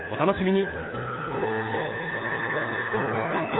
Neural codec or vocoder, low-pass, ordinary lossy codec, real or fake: codec, 16 kHz, 4 kbps, FunCodec, trained on LibriTTS, 50 frames a second; 7.2 kHz; AAC, 16 kbps; fake